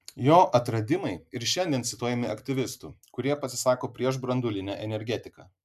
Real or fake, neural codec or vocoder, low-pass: real; none; 14.4 kHz